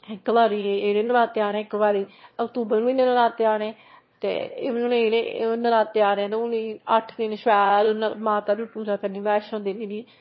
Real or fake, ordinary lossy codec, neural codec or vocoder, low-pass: fake; MP3, 24 kbps; autoencoder, 22.05 kHz, a latent of 192 numbers a frame, VITS, trained on one speaker; 7.2 kHz